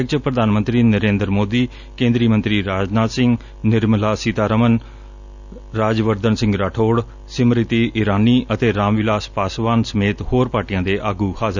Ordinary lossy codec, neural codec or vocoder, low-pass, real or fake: none; none; 7.2 kHz; real